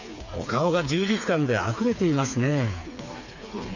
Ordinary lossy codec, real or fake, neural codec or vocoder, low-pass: none; fake; codec, 16 kHz, 4 kbps, FreqCodec, smaller model; 7.2 kHz